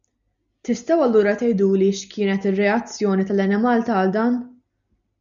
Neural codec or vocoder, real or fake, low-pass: none; real; 7.2 kHz